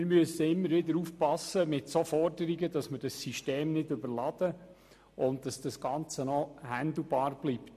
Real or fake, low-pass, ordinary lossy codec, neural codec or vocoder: real; 14.4 kHz; MP3, 64 kbps; none